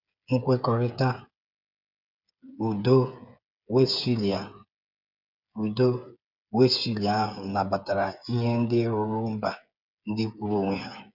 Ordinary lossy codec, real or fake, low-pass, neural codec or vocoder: none; fake; 5.4 kHz; codec, 16 kHz, 8 kbps, FreqCodec, smaller model